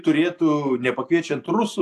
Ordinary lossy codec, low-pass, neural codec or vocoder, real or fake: AAC, 64 kbps; 14.4 kHz; none; real